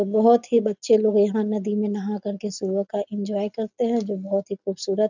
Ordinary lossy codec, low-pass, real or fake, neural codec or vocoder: none; 7.2 kHz; real; none